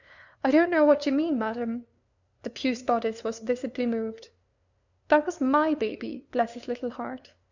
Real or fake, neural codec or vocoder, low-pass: fake; codec, 16 kHz, 2 kbps, FunCodec, trained on LibriTTS, 25 frames a second; 7.2 kHz